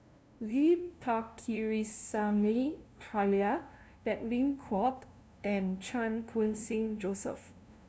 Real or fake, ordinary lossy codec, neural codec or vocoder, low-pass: fake; none; codec, 16 kHz, 0.5 kbps, FunCodec, trained on LibriTTS, 25 frames a second; none